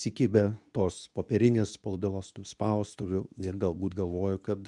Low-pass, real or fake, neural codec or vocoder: 10.8 kHz; fake; codec, 24 kHz, 0.9 kbps, WavTokenizer, medium speech release version 2